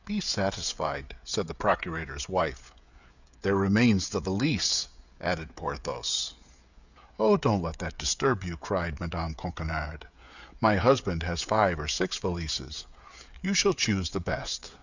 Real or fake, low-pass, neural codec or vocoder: fake; 7.2 kHz; codec, 16 kHz, 16 kbps, FreqCodec, smaller model